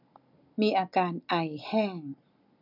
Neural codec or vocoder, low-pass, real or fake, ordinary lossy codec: none; 5.4 kHz; real; none